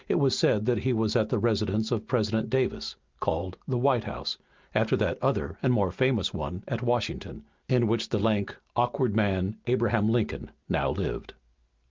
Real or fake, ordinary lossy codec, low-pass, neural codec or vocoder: real; Opus, 32 kbps; 7.2 kHz; none